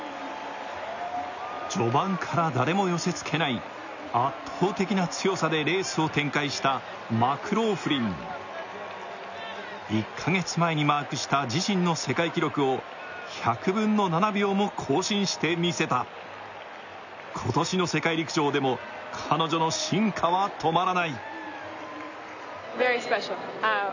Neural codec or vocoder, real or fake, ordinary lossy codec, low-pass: none; real; none; 7.2 kHz